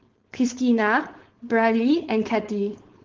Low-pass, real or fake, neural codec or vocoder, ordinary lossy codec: 7.2 kHz; fake; codec, 16 kHz, 4.8 kbps, FACodec; Opus, 16 kbps